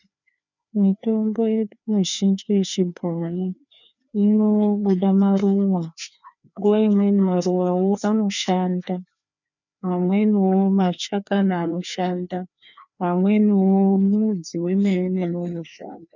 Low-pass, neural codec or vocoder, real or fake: 7.2 kHz; codec, 16 kHz, 2 kbps, FreqCodec, larger model; fake